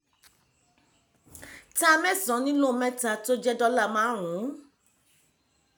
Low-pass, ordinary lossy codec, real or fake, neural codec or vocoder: none; none; real; none